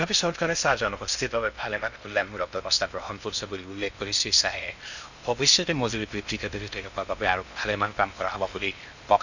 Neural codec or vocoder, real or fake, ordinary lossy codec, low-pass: codec, 16 kHz in and 24 kHz out, 0.6 kbps, FocalCodec, streaming, 2048 codes; fake; none; 7.2 kHz